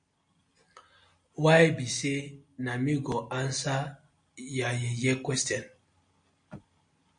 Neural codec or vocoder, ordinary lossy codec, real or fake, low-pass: none; MP3, 48 kbps; real; 9.9 kHz